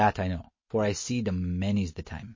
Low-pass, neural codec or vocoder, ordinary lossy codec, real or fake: 7.2 kHz; none; MP3, 32 kbps; real